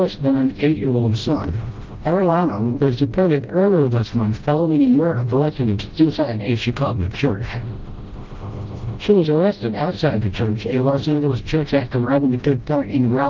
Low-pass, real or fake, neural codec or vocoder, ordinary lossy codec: 7.2 kHz; fake; codec, 16 kHz, 0.5 kbps, FreqCodec, smaller model; Opus, 32 kbps